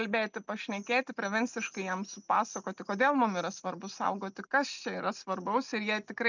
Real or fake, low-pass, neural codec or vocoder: real; 7.2 kHz; none